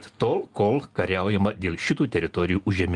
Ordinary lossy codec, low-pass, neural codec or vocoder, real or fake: Opus, 32 kbps; 10.8 kHz; none; real